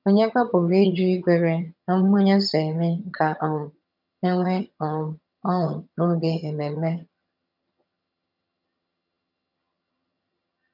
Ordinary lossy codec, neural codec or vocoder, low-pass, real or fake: none; vocoder, 22.05 kHz, 80 mel bands, HiFi-GAN; 5.4 kHz; fake